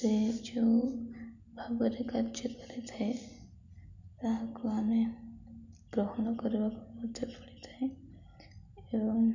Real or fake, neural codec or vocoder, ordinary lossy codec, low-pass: real; none; none; 7.2 kHz